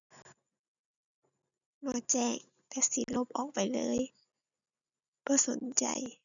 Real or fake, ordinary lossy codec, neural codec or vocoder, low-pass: real; none; none; 7.2 kHz